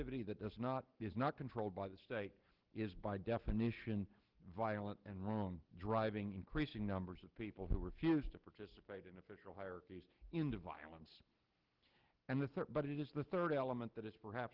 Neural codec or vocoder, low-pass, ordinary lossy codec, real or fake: none; 5.4 kHz; Opus, 16 kbps; real